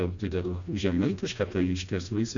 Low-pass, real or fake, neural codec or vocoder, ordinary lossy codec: 7.2 kHz; fake; codec, 16 kHz, 1 kbps, FreqCodec, smaller model; MP3, 64 kbps